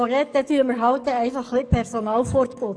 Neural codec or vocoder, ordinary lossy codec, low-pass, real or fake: codec, 44.1 kHz, 7.8 kbps, DAC; none; 9.9 kHz; fake